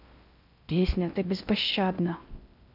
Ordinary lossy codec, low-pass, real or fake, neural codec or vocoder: none; 5.4 kHz; fake; codec, 16 kHz in and 24 kHz out, 0.6 kbps, FocalCodec, streaming, 4096 codes